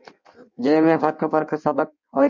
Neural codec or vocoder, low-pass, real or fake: codec, 16 kHz in and 24 kHz out, 1.1 kbps, FireRedTTS-2 codec; 7.2 kHz; fake